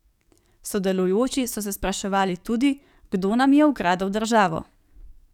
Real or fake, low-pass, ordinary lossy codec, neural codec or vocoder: fake; 19.8 kHz; none; codec, 44.1 kHz, 7.8 kbps, DAC